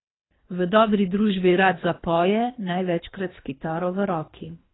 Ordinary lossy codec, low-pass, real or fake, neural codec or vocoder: AAC, 16 kbps; 7.2 kHz; fake; codec, 24 kHz, 3 kbps, HILCodec